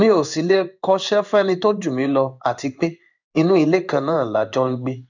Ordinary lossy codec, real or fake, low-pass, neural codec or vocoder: none; fake; 7.2 kHz; codec, 16 kHz in and 24 kHz out, 1 kbps, XY-Tokenizer